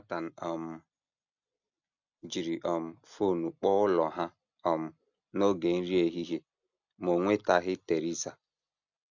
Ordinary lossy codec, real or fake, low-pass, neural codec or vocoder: none; real; 7.2 kHz; none